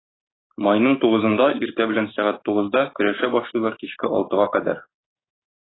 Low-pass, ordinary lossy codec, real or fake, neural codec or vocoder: 7.2 kHz; AAC, 16 kbps; fake; codec, 16 kHz, 6 kbps, DAC